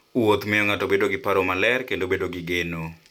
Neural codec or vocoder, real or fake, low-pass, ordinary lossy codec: none; real; 19.8 kHz; none